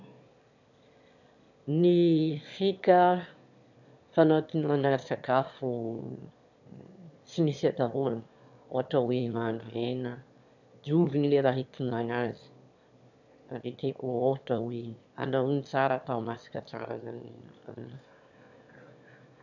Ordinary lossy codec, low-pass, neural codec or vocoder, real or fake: none; 7.2 kHz; autoencoder, 22.05 kHz, a latent of 192 numbers a frame, VITS, trained on one speaker; fake